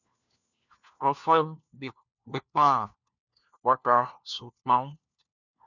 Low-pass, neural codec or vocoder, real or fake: 7.2 kHz; codec, 16 kHz, 1 kbps, FunCodec, trained on LibriTTS, 50 frames a second; fake